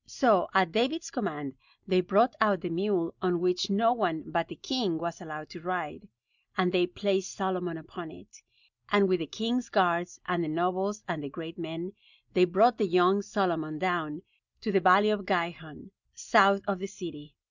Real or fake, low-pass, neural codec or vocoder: real; 7.2 kHz; none